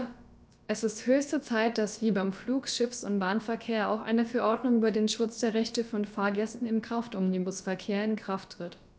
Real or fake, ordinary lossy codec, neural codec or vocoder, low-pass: fake; none; codec, 16 kHz, about 1 kbps, DyCAST, with the encoder's durations; none